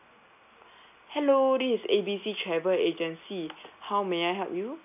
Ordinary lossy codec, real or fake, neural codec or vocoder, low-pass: none; real; none; 3.6 kHz